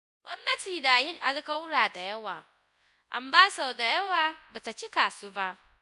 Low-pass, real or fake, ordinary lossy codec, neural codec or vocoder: 10.8 kHz; fake; AAC, 96 kbps; codec, 24 kHz, 0.9 kbps, WavTokenizer, large speech release